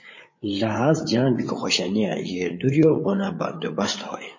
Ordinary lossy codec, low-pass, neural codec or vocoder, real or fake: MP3, 32 kbps; 7.2 kHz; codec, 16 kHz, 8 kbps, FreqCodec, larger model; fake